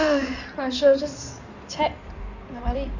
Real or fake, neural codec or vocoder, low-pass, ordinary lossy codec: fake; codec, 16 kHz in and 24 kHz out, 2.2 kbps, FireRedTTS-2 codec; 7.2 kHz; none